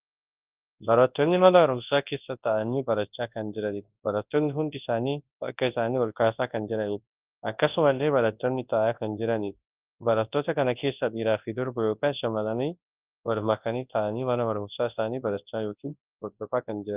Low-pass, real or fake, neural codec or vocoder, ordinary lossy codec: 3.6 kHz; fake; codec, 24 kHz, 0.9 kbps, WavTokenizer, large speech release; Opus, 32 kbps